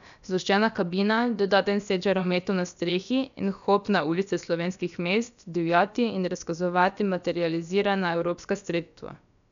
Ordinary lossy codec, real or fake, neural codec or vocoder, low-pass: none; fake; codec, 16 kHz, about 1 kbps, DyCAST, with the encoder's durations; 7.2 kHz